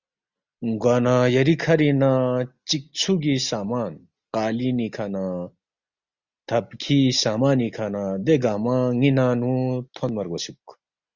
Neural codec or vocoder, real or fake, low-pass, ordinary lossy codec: none; real; 7.2 kHz; Opus, 64 kbps